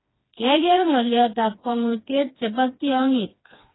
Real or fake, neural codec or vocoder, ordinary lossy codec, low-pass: fake; codec, 16 kHz, 2 kbps, FreqCodec, smaller model; AAC, 16 kbps; 7.2 kHz